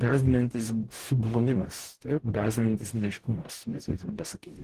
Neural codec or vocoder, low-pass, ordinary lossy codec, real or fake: codec, 44.1 kHz, 0.9 kbps, DAC; 14.4 kHz; Opus, 16 kbps; fake